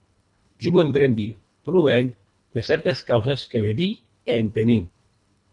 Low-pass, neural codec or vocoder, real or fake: 10.8 kHz; codec, 24 kHz, 1.5 kbps, HILCodec; fake